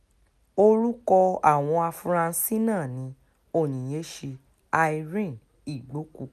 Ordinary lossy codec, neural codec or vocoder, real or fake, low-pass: none; none; real; 14.4 kHz